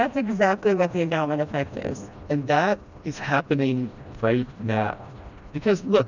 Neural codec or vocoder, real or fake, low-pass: codec, 16 kHz, 1 kbps, FreqCodec, smaller model; fake; 7.2 kHz